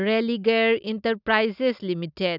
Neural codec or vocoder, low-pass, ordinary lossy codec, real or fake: none; 5.4 kHz; none; real